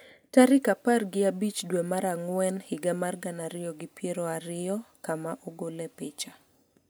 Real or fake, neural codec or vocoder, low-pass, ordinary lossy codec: real; none; none; none